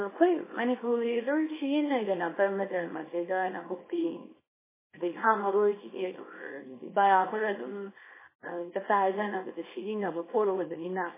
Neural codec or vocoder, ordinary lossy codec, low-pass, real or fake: codec, 24 kHz, 0.9 kbps, WavTokenizer, small release; MP3, 16 kbps; 3.6 kHz; fake